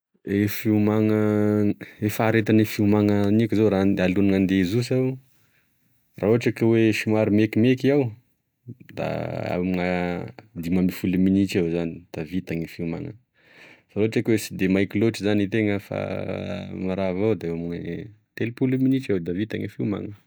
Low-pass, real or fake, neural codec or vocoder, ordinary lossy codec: none; real; none; none